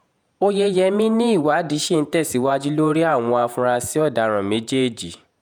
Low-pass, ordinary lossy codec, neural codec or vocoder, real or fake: none; none; vocoder, 48 kHz, 128 mel bands, Vocos; fake